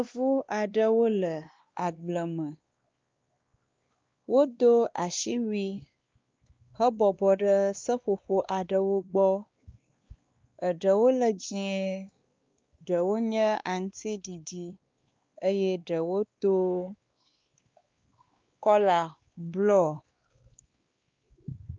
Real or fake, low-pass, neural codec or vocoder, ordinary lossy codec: fake; 7.2 kHz; codec, 16 kHz, 2 kbps, X-Codec, WavLM features, trained on Multilingual LibriSpeech; Opus, 24 kbps